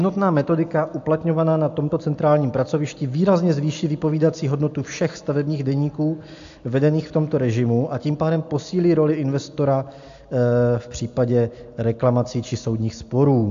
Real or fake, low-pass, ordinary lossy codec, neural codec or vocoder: real; 7.2 kHz; AAC, 64 kbps; none